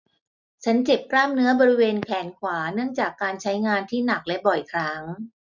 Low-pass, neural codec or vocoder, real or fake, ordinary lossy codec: 7.2 kHz; none; real; none